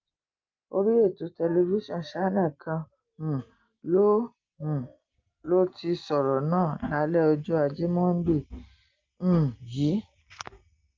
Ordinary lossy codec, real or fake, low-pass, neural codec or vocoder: Opus, 32 kbps; real; 7.2 kHz; none